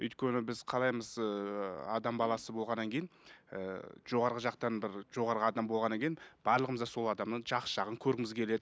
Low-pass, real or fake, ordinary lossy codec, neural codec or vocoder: none; real; none; none